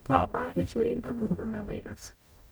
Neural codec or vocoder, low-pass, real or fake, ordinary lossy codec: codec, 44.1 kHz, 0.9 kbps, DAC; none; fake; none